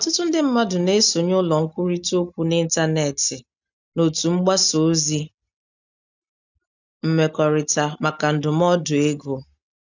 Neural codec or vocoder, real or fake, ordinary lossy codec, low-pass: none; real; none; 7.2 kHz